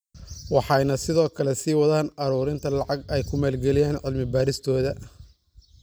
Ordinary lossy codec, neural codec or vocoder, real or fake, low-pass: none; none; real; none